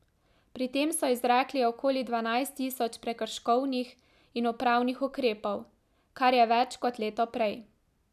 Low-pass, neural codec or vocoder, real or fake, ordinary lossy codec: 14.4 kHz; none; real; none